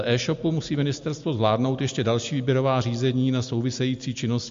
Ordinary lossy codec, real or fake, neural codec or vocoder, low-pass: MP3, 48 kbps; real; none; 7.2 kHz